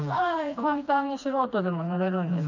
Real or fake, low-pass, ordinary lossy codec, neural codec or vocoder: fake; 7.2 kHz; none; codec, 16 kHz, 2 kbps, FreqCodec, smaller model